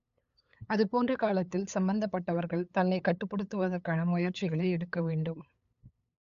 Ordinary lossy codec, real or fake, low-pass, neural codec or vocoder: MP3, 64 kbps; fake; 7.2 kHz; codec, 16 kHz, 8 kbps, FunCodec, trained on LibriTTS, 25 frames a second